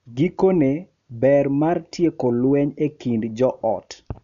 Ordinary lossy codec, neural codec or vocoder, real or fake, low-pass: none; none; real; 7.2 kHz